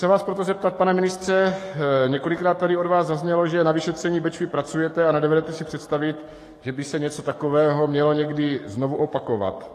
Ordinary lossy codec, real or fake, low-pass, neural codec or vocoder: AAC, 48 kbps; fake; 14.4 kHz; codec, 44.1 kHz, 7.8 kbps, DAC